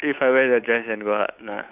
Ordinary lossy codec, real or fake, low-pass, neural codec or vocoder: none; fake; 3.6 kHz; codec, 16 kHz, 8 kbps, FunCodec, trained on Chinese and English, 25 frames a second